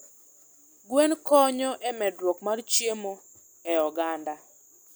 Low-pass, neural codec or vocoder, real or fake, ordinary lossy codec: none; none; real; none